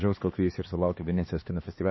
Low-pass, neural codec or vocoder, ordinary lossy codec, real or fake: 7.2 kHz; codec, 16 kHz, 2 kbps, X-Codec, HuBERT features, trained on LibriSpeech; MP3, 24 kbps; fake